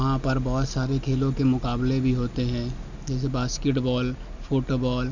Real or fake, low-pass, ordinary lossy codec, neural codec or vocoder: real; 7.2 kHz; none; none